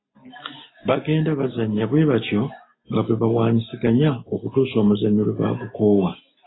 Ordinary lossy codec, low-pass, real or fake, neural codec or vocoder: AAC, 16 kbps; 7.2 kHz; real; none